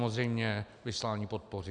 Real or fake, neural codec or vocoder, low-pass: real; none; 9.9 kHz